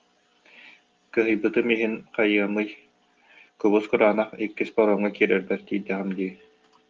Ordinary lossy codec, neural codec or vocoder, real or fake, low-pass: Opus, 32 kbps; none; real; 7.2 kHz